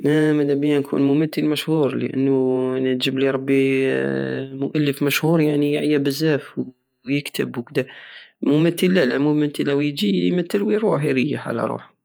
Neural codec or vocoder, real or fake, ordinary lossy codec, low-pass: vocoder, 48 kHz, 128 mel bands, Vocos; fake; none; none